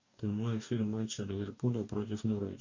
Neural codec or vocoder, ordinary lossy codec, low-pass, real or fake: codec, 44.1 kHz, 2.6 kbps, DAC; MP3, 48 kbps; 7.2 kHz; fake